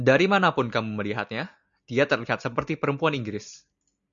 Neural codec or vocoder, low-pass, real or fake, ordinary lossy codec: none; 7.2 kHz; real; MP3, 96 kbps